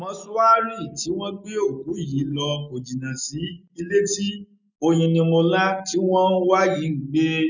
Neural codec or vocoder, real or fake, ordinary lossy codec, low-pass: none; real; none; 7.2 kHz